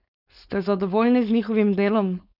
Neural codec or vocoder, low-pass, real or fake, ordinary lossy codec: codec, 16 kHz, 4.8 kbps, FACodec; 5.4 kHz; fake; none